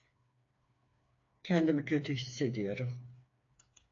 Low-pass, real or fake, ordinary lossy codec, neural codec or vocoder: 7.2 kHz; fake; AAC, 48 kbps; codec, 16 kHz, 4 kbps, FreqCodec, smaller model